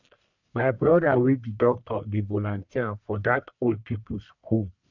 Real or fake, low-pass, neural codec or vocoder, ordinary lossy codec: fake; 7.2 kHz; codec, 44.1 kHz, 1.7 kbps, Pupu-Codec; none